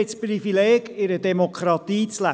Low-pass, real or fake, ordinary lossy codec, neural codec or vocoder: none; real; none; none